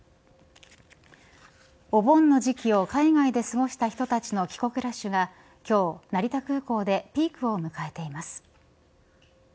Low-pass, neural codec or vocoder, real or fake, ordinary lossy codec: none; none; real; none